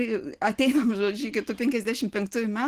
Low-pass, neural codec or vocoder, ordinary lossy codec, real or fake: 14.4 kHz; none; Opus, 16 kbps; real